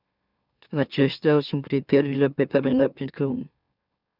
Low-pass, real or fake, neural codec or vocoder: 5.4 kHz; fake; autoencoder, 44.1 kHz, a latent of 192 numbers a frame, MeloTTS